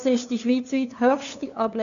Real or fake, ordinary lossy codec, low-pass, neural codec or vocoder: fake; none; 7.2 kHz; codec, 16 kHz, 2 kbps, FunCodec, trained on LibriTTS, 25 frames a second